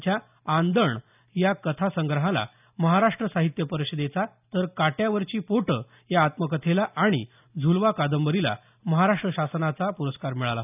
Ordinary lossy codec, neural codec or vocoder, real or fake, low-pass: none; none; real; 3.6 kHz